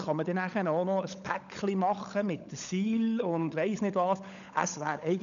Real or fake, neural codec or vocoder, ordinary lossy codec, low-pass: fake; codec, 16 kHz, 16 kbps, FunCodec, trained on LibriTTS, 50 frames a second; none; 7.2 kHz